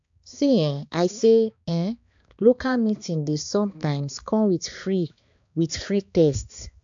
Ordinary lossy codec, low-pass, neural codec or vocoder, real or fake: AAC, 64 kbps; 7.2 kHz; codec, 16 kHz, 2 kbps, X-Codec, HuBERT features, trained on balanced general audio; fake